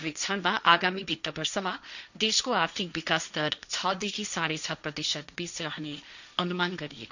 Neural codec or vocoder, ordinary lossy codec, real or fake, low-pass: codec, 16 kHz, 1.1 kbps, Voila-Tokenizer; none; fake; 7.2 kHz